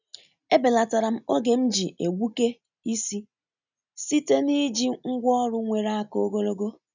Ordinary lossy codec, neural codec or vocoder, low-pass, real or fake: none; none; 7.2 kHz; real